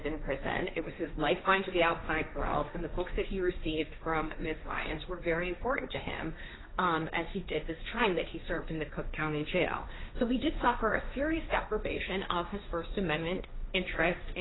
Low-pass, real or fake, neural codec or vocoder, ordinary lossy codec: 7.2 kHz; fake; codec, 16 kHz, 1.1 kbps, Voila-Tokenizer; AAC, 16 kbps